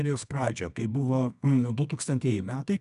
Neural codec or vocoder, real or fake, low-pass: codec, 24 kHz, 0.9 kbps, WavTokenizer, medium music audio release; fake; 10.8 kHz